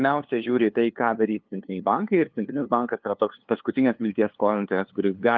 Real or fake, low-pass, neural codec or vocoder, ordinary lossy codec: fake; 7.2 kHz; codec, 16 kHz, 2 kbps, FunCodec, trained on LibriTTS, 25 frames a second; Opus, 32 kbps